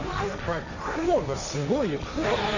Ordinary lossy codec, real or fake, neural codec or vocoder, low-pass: none; fake; codec, 16 kHz, 1.1 kbps, Voila-Tokenizer; 7.2 kHz